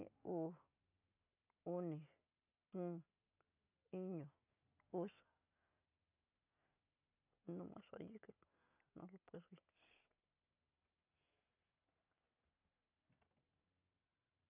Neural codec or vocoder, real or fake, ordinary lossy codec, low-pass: none; real; none; 3.6 kHz